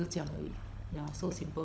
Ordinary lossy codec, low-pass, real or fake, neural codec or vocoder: none; none; fake; codec, 16 kHz, 16 kbps, FunCodec, trained on LibriTTS, 50 frames a second